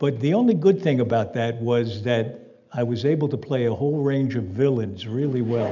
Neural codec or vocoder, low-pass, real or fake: none; 7.2 kHz; real